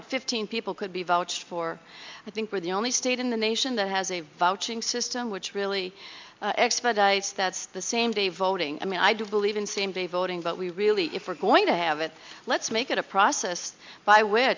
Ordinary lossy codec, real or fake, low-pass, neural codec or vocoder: MP3, 64 kbps; real; 7.2 kHz; none